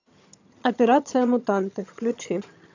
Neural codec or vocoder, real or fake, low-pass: vocoder, 22.05 kHz, 80 mel bands, HiFi-GAN; fake; 7.2 kHz